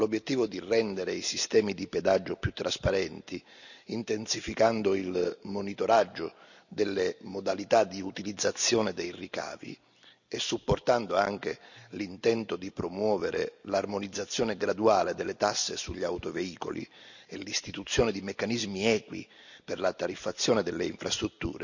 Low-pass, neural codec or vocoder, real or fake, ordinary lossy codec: 7.2 kHz; none; real; none